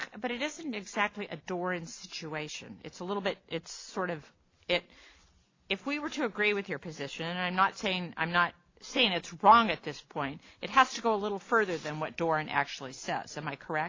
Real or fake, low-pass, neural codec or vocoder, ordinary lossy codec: real; 7.2 kHz; none; AAC, 32 kbps